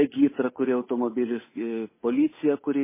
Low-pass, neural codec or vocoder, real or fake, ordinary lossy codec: 3.6 kHz; codec, 16 kHz, 8 kbps, FunCodec, trained on Chinese and English, 25 frames a second; fake; MP3, 16 kbps